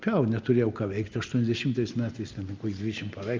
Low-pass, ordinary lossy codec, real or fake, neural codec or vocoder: 7.2 kHz; Opus, 24 kbps; real; none